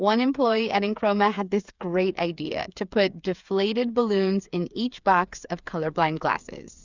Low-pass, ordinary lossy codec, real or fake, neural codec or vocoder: 7.2 kHz; Opus, 64 kbps; fake; codec, 16 kHz, 8 kbps, FreqCodec, smaller model